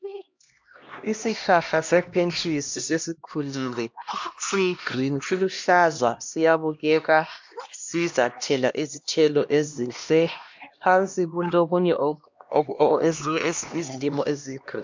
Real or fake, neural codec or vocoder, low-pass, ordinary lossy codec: fake; codec, 16 kHz, 1 kbps, X-Codec, HuBERT features, trained on LibriSpeech; 7.2 kHz; MP3, 64 kbps